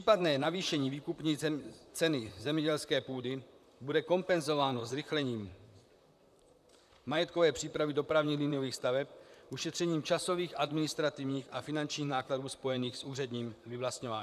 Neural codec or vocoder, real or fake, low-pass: vocoder, 44.1 kHz, 128 mel bands, Pupu-Vocoder; fake; 14.4 kHz